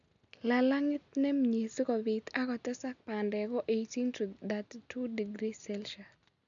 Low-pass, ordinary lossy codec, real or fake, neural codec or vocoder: 7.2 kHz; none; real; none